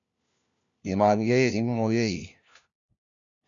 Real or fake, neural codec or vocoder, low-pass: fake; codec, 16 kHz, 1 kbps, FunCodec, trained on LibriTTS, 50 frames a second; 7.2 kHz